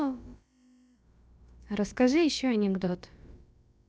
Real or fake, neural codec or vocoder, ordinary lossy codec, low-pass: fake; codec, 16 kHz, about 1 kbps, DyCAST, with the encoder's durations; none; none